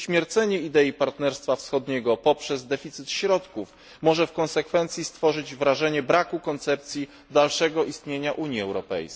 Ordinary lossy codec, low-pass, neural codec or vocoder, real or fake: none; none; none; real